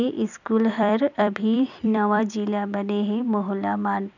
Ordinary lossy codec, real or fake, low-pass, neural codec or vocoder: none; fake; 7.2 kHz; vocoder, 44.1 kHz, 128 mel bands every 256 samples, BigVGAN v2